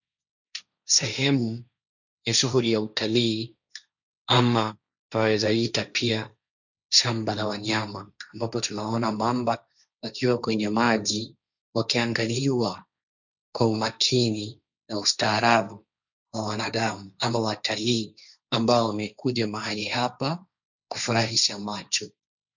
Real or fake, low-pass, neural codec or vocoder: fake; 7.2 kHz; codec, 16 kHz, 1.1 kbps, Voila-Tokenizer